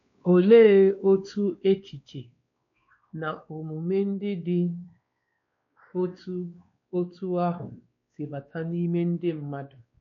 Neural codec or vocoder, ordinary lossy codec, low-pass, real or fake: codec, 16 kHz, 2 kbps, X-Codec, WavLM features, trained on Multilingual LibriSpeech; MP3, 48 kbps; 7.2 kHz; fake